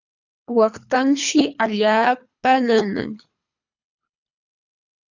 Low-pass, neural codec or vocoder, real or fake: 7.2 kHz; codec, 24 kHz, 3 kbps, HILCodec; fake